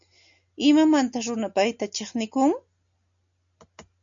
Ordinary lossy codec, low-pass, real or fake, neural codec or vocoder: MP3, 64 kbps; 7.2 kHz; real; none